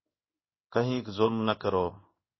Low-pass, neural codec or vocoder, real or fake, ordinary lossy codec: 7.2 kHz; codec, 16 kHz in and 24 kHz out, 1 kbps, XY-Tokenizer; fake; MP3, 24 kbps